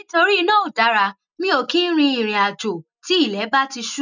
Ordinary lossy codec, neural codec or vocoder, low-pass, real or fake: none; none; 7.2 kHz; real